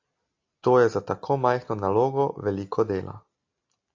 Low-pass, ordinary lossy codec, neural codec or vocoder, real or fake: 7.2 kHz; AAC, 48 kbps; none; real